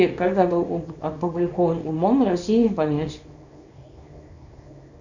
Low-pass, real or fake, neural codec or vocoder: 7.2 kHz; fake; codec, 24 kHz, 0.9 kbps, WavTokenizer, small release